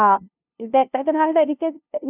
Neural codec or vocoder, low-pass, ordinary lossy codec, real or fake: codec, 16 kHz, 0.5 kbps, FunCodec, trained on LibriTTS, 25 frames a second; 3.6 kHz; none; fake